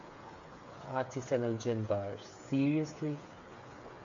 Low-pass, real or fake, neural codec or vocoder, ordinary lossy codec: 7.2 kHz; fake; codec, 16 kHz, 8 kbps, FreqCodec, smaller model; MP3, 64 kbps